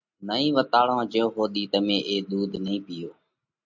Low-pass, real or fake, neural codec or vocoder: 7.2 kHz; real; none